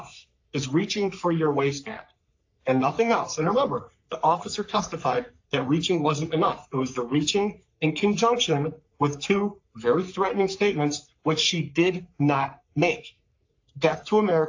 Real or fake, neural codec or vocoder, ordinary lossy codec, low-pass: fake; codec, 44.1 kHz, 3.4 kbps, Pupu-Codec; AAC, 48 kbps; 7.2 kHz